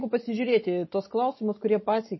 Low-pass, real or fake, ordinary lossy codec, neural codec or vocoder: 7.2 kHz; real; MP3, 24 kbps; none